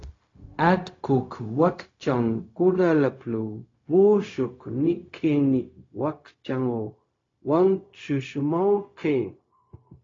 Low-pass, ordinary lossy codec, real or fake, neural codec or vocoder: 7.2 kHz; AAC, 32 kbps; fake; codec, 16 kHz, 0.4 kbps, LongCat-Audio-Codec